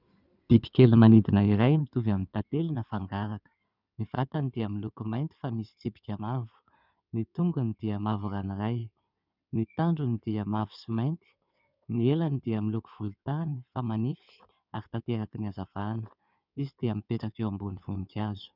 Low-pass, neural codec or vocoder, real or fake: 5.4 kHz; codec, 16 kHz in and 24 kHz out, 2.2 kbps, FireRedTTS-2 codec; fake